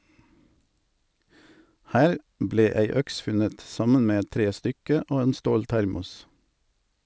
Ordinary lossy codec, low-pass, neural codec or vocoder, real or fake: none; none; none; real